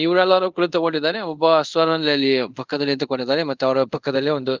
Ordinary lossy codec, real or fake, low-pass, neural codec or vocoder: Opus, 32 kbps; fake; 7.2 kHz; codec, 24 kHz, 0.5 kbps, DualCodec